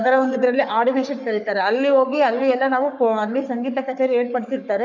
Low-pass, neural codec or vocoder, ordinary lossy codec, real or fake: 7.2 kHz; codec, 44.1 kHz, 3.4 kbps, Pupu-Codec; none; fake